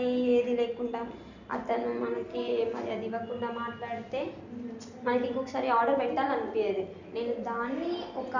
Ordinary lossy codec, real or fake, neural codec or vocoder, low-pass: none; real; none; 7.2 kHz